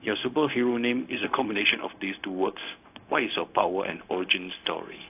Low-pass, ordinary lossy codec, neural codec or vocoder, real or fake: 3.6 kHz; AAC, 32 kbps; codec, 16 kHz, 0.4 kbps, LongCat-Audio-Codec; fake